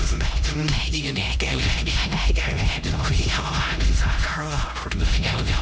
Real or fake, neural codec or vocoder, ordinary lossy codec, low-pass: fake; codec, 16 kHz, 0.5 kbps, X-Codec, HuBERT features, trained on LibriSpeech; none; none